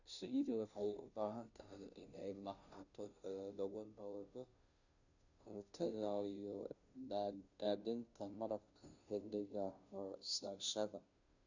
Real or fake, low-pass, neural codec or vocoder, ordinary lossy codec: fake; 7.2 kHz; codec, 16 kHz, 0.5 kbps, FunCodec, trained on Chinese and English, 25 frames a second; none